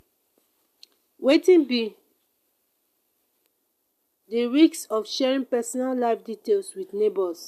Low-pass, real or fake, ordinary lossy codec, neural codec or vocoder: 14.4 kHz; real; MP3, 96 kbps; none